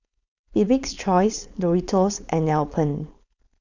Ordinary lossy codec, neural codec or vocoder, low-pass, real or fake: none; codec, 16 kHz, 4.8 kbps, FACodec; 7.2 kHz; fake